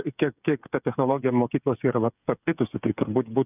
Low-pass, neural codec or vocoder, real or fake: 3.6 kHz; codec, 16 kHz, 8 kbps, FreqCodec, smaller model; fake